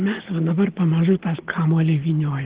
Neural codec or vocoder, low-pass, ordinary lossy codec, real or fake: none; 3.6 kHz; Opus, 16 kbps; real